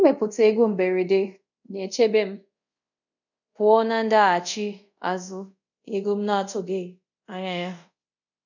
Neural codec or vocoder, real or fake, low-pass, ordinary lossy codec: codec, 24 kHz, 0.5 kbps, DualCodec; fake; 7.2 kHz; none